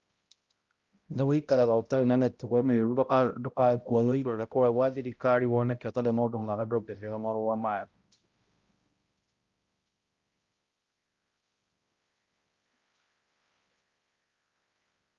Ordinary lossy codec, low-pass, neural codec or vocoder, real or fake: Opus, 32 kbps; 7.2 kHz; codec, 16 kHz, 0.5 kbps, X-Codec, HuBERT features, trained on balanced general audio; fake